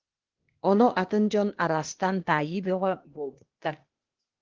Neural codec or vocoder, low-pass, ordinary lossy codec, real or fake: codec, 16 kHz, 0.8 kbps, ZipCodec; 7.2 kHz; Opus, 16 kbps; fake